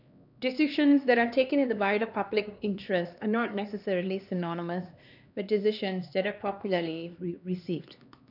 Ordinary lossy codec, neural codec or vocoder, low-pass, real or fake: none; codec, 16 kHz, 2 kbps, X-Codec, HuBERT features, trained on LibriSpeech; 5.4 kHz; fake